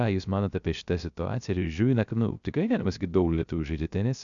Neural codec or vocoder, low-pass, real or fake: codec, 16 kHz, 0.3 kbps, FocalCodec; 7.2 kHz; fake